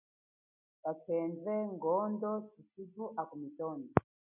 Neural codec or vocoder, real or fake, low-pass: none; real; 3.6 kHz